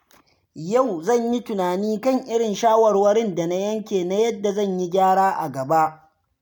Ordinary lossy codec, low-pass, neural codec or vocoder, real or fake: none; none; none; real